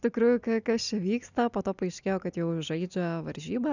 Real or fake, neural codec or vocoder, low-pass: real; none; 7.2 kHz